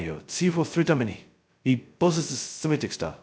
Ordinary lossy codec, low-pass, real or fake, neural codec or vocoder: none; none; fake; codec, 16 kHz, 0.2 kbps, FocalCodec